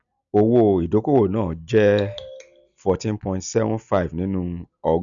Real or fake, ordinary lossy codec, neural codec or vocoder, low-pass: real; none; none; 7.2 kHz